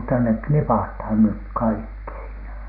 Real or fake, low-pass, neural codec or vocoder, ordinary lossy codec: real; 5.4 kHz; none; none